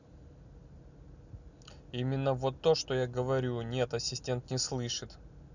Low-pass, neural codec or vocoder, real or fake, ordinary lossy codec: 7.2 kHz; none; real; none